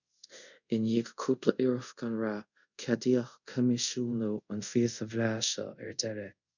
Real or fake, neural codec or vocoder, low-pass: fake; codec, 24 kHz, 0.5 kbps, DualCodec; 7.2 kHz